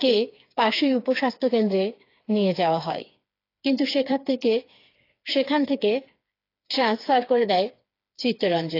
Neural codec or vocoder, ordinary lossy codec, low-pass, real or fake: codec, 16 kHz, 4 kbps, FreqCodec, smaller model; AAC, 32 kbps; 5.4 kHz; fake